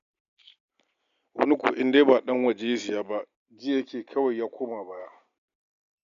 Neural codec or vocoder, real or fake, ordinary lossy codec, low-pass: none; real; none; 7.2 kHz